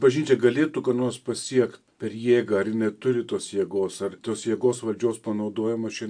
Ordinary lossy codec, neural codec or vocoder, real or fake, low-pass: AAC, 64 kbps; none; real; 9.9 kHz